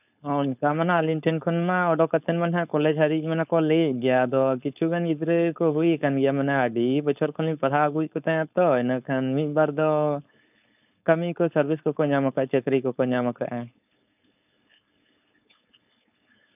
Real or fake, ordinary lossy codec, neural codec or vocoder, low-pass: fake; none; codec, 16 kHz, 4.8 kbps, FACodec; 3.6 kHz